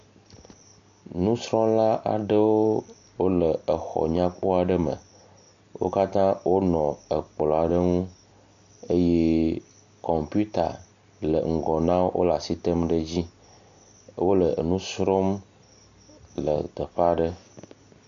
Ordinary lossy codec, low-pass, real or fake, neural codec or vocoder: MP3, 64 kbps; 7.2 kHz; real; none